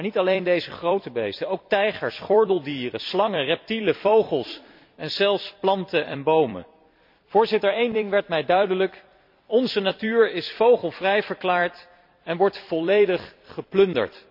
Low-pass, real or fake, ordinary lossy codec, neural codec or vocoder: 5.4 kHz; fake; MP3, 48 kbps; vocoder, 44.1 kHz, 128 mel bands every 256 samples, BigVGAN v2